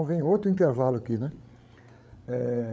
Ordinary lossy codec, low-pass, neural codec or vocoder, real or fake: none; none; codec, 16 kHz, 8 kbps, FreqCodec, larger model; fake